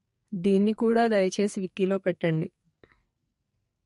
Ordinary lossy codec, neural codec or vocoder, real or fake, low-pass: MP3, 48 kbps; codec, 44.1 kHz, 2.6 kbps, SNAC; fake; 14.4 kHz